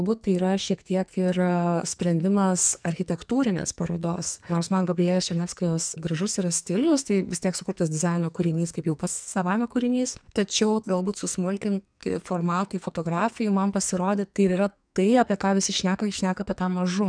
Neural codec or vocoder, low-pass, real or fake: codec, 44.1 kHz, 2.6 kbps, SNAC; 9.9 kHz; fake